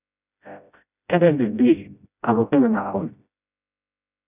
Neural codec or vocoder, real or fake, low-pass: codec, 16 kHz, 0.5 kbps, FreqCodec, smaller model; fake; 3.6 kHz